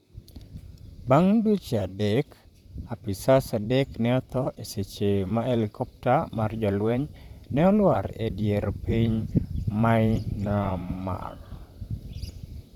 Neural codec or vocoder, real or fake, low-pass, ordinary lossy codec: vocoder, 44.1 kHz, 128 mel bands, Pupu-Vocoder; fake; 19.8 kHz; none